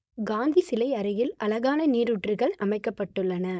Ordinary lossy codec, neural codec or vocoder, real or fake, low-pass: none; codec, 16 kHz, 4.8 kbps, FACodec; fake; none